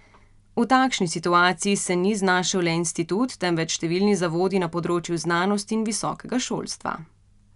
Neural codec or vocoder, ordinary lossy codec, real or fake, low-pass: none; none; real; 10.8 kHz